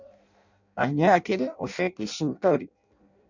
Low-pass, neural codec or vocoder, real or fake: 7.2 kHz; codec, 16 kHz in and 24 kHz out, 0.6 kbps, FireRedTTS-2 codec; fake